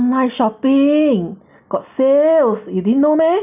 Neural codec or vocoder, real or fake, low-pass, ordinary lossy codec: none; real; 3.6 kHz; none